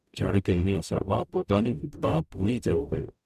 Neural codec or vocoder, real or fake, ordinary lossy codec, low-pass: codec, 44.1 kHz, 0.9 kbps, DAC; fake; none; 14.4 kHz